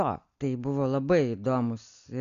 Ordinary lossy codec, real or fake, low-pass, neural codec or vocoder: AAC, 96 kbps; real; 7.2 kHz; none